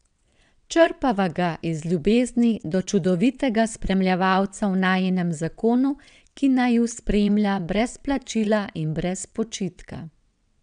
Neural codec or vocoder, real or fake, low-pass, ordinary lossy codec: vocoder, 22.05 kHz, 80 mel bands, WaveNeXt; fake; 9.9 kHz; none